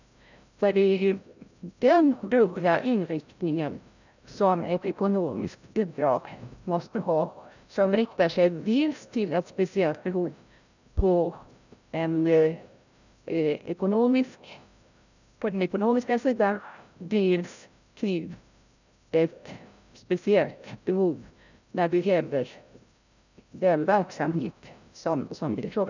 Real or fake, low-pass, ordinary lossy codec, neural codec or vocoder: fake; 7.2 kHz; none; codec, 16 kHz, 0.5 kbps, FreqCodec, larger model